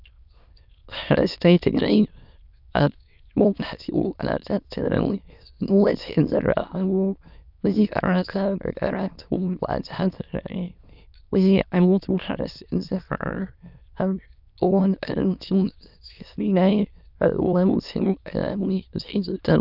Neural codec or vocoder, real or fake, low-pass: autoencoder, 22.05 kHz, a latent of 192 numbers a frame, VITS, trained on many speakers; fake; 5.4 kHz